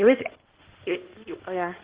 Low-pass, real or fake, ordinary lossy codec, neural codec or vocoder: 3.6 kHz; fake; Opus, 16 kbps; codec, 16 kHz in and 24 kHz out, 2.2 kbps, FireRedTTS-2 codec